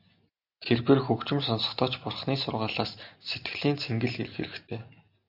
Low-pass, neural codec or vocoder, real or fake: 5.4 kHz; none; real